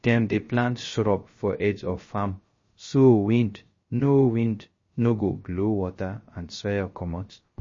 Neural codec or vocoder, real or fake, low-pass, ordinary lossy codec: codec, 16 kHz, 0.3 kbps, FocalCodec; fake; 7.2 kHz; MP3, 32 kbps